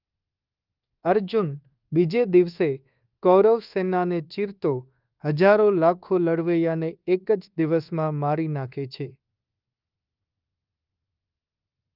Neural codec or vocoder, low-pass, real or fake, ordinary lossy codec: codec, 24 kHz, 1.2 kbps, DualCodec; 5.4 kHz; fake; Opus, 32 kbps